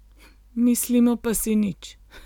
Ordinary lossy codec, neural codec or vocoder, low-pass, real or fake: none; none; 19.8 kHz; real